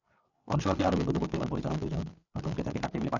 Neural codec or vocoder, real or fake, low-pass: codec, 16 kHz, 8 kbps, FreqCodec, larger model; fake; 7.2 kHz